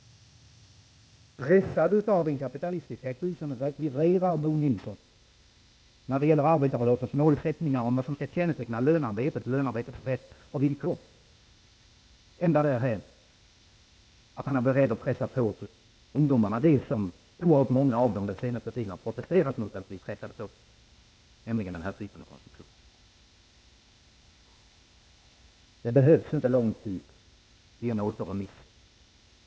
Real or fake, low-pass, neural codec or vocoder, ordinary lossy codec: fake; none; codec, 16 kHz, 0.8 kbps, ZipCodec; none